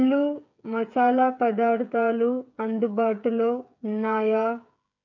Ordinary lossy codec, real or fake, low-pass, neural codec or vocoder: none; fake; 7.2 kHz; codec, 16 kHz, 8 kbps, FreqCodec, smaller model